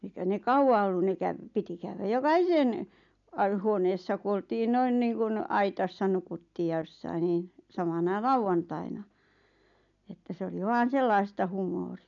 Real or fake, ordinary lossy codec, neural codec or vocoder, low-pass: real; none; none; 7.2 kHz